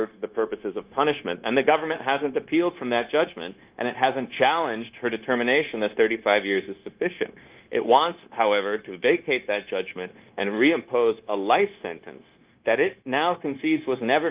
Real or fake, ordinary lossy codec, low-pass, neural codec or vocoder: fake; Opus, 32 kbps; 3.6 kHz; codec, 16 kHz, 0.9 kbps, LongCat-Audio-Codec